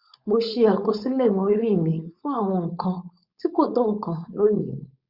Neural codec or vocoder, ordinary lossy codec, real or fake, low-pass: codec, 16 kHz, 4.8 kbps, FACodec; Opus, 64 kbps; fake; 5.4 kHz